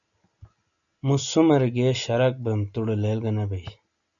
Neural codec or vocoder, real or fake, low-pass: none; real; 7.2 kHz